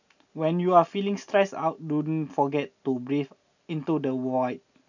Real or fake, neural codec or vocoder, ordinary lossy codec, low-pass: real; none; none; 7.2 kHz